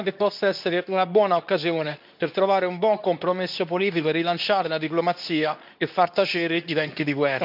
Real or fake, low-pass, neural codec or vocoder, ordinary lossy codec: fake; 5.4 kHz; codec, 24 kHz, 0.9 kbps, WavTokenizer, medium speech release version 2; none